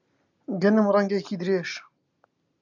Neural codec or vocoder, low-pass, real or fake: none; 7.2 kHz; real